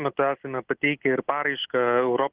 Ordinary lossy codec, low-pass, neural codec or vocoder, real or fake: Opus, 16 kbps; 3.6 kHz; none; real